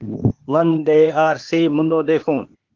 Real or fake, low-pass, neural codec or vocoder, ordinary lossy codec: fake; 7.2 kHz; codec, 16 kHz, 0.8 kbps, ZipCodec; Opus, 24 kbps